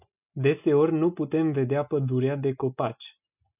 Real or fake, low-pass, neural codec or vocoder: real; 3.6 kHz; none